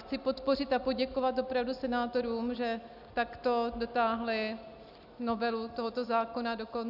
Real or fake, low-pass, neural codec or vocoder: real; 5.4 kHz; none